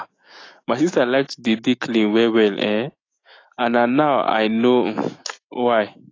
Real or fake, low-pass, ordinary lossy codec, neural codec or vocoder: fake; 7.2 kHz; AAC, 32 kbps; autoencoder, 48 kHz, 128 numbers a frame, DAC-VAE, trained on Japanese speech